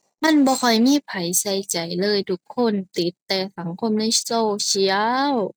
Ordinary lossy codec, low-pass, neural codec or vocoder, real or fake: none; none; none; real